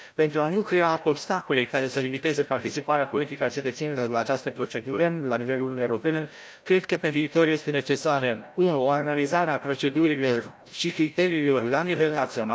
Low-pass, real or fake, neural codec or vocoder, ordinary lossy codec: none; fake; codec, 16 kHz, 0.5 kbps, FreqCodec, larger model; none